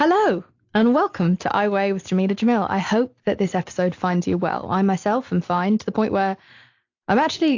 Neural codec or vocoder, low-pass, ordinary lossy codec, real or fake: none; 7.2 kHz; AAC, 48 kbps; real